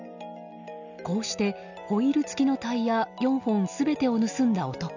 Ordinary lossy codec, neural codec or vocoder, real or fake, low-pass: none; none; real; 7.2 kHz